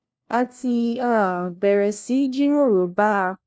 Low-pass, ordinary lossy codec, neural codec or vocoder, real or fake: none; none; codec, 16 kHz, 1 kbps, FunCodec, trained on LibriTTS, 50 frames a second; fake